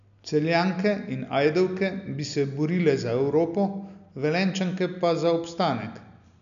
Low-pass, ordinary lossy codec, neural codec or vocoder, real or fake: 7.2 kHz; none; none; real